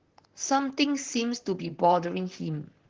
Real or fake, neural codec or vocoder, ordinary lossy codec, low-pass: fake; vocoder, 44.1 kHz, 128 mel bands, Pupu-Vocoder; Opus, 16 kbps; 7.2 kHz